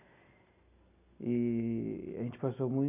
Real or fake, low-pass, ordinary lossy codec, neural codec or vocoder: real; 3.6 kHz; none; none